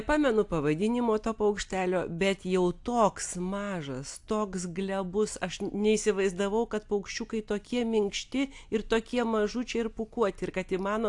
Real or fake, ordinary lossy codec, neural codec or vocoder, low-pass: real; AAC, 64 kbps; none; 10.8 kHz